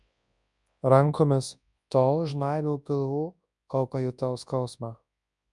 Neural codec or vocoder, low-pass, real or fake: codec, 24 kHz, 0.9 kbps, WavTokenizer, large speech release; 10.8 kHz; fake